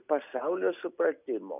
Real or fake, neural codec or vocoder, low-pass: real; none; 3.6 kHz